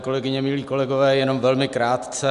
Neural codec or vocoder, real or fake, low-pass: none; real; 10.8 kHz